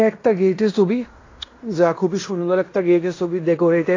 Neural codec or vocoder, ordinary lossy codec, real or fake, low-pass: codec, 16 kHz in and 24 kHz out, 0.9 kbps, LongCat-Audio-Codec, fine tuned four codebook decoder; AAC, 32 kbps; fake; 7.2 kHz